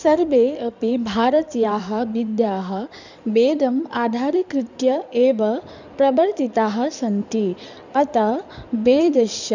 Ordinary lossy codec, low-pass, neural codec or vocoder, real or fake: none; 7.2 kHz; codec, 16 kHz in and 24 kHz out, 2.2 kbps, FireRedTTS-2 codec; fake